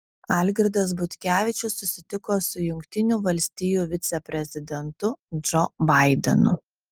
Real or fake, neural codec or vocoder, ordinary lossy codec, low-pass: real; none; Opus, 32 kbps; 19.8 kHz